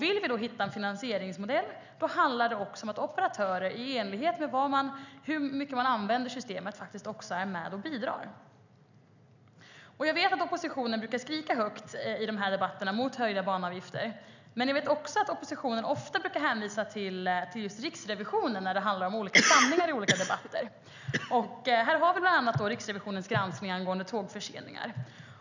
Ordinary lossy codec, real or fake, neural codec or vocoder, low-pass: none; real; none; 7.2 kHz